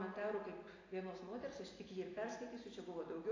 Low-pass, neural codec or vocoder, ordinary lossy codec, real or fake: 7.2 kHz; none; AAC, 32 kbps; real